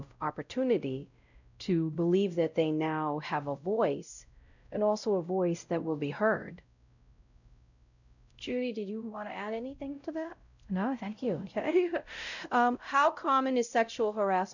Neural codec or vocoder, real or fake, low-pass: codec, 16 kHz, 0.5 kbps, X-Codec, WavLM features, trained on Multilingual LibriSpeech; fake; 7.2 kHz